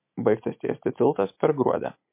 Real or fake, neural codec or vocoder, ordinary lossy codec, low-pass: real; none; MP3, 24 kbps; 3.6 kHz